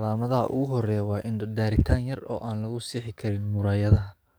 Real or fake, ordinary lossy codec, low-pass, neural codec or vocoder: fake; none; none; codec, 44.1 kHz, 7.8 kbps, DAC